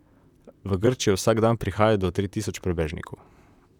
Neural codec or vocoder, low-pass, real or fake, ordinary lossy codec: vocoder, 44.1 kHz, 128 mel bands, Pupu-Vocoder; 19.8 kHz; fake; none